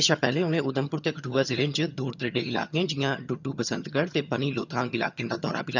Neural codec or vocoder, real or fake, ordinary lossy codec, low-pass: vocoder, 22.05 kHz, 80 mel bands, HiFi-GAN; fake; none; 7.2 kHz